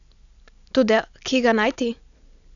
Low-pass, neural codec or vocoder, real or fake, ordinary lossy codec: 7.2 kHz; none; real; none